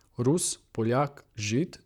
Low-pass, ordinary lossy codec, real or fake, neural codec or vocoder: 19.8 kHz; none; fake; vocoder, 44.1 kHz, 128 mel bands, Pupu-Vocoder